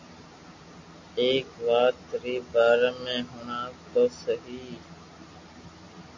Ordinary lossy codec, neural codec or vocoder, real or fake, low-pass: MP3, 48 kbps; none; real; 7.2 kHz